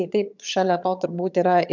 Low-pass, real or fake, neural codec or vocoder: 7.2 kHz; fake; vocoder, 22.05 kHz, 80 mel bands, HiFi-GAN